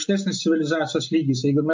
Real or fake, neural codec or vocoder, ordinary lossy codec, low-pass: real; none; MP3, 48 kbps; 7.2 kHz